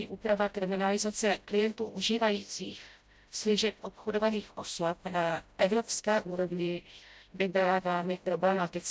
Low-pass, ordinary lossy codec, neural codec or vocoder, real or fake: none; none; codec, 16 kHz, 0.5 kbps, FreqCodec, smaller model; fake